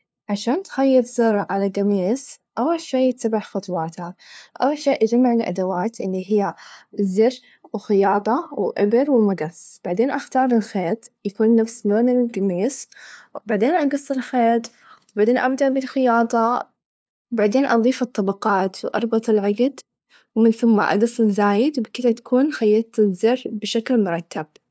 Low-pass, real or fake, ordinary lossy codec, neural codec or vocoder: none; fake; none; codec, 16 kHz, 2 kbps, FunCodec, trained on LibriTTS, 25 frames a second